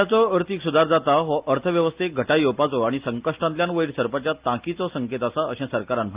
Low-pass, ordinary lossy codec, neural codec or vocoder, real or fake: 3.6 kHz; Opus, 32 kbps; none; real